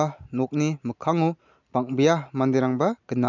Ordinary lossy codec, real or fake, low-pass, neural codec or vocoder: none; real; 7.2 kHz; none